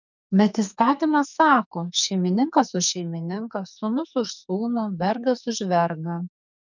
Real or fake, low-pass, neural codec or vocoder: fake; 7.2 kHz; codec, 44.1 kHz, 2.6 kbps, SNAC